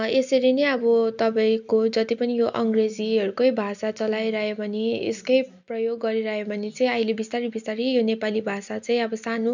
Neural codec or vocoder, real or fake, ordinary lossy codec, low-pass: none; real; none; 7.2 kHz